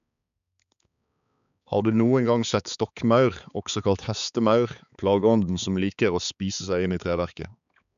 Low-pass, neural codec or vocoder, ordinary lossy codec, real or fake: 7.2 kHz; codec, 16 kHz, 4 kbps, X-Codec, HuBERT features, trained on balanced general audio; none; fake